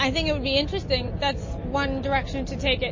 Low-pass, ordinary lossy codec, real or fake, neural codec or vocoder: 7.2 kHz; MP3, 32 kbps; real; none